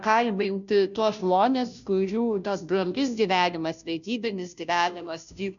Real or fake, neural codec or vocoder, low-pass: fake; codec, 16 kHz, 0.5 kbps, FunCodec, trained on Chinese and English, 25 frames a second; 7.2 kHz